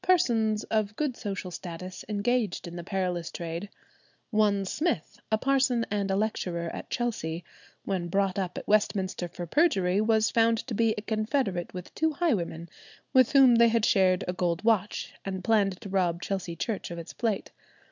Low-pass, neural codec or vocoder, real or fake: 7.2 kHz; none; real